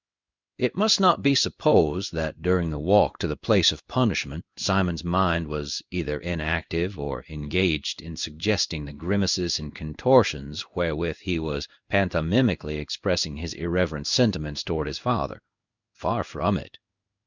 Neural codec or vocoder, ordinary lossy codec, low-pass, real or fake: codec, 16 kHz in and 24 kHz out, 1 kbps, XY-Tokenizer; Opus, 64 kbps; 7.2 kHz; fake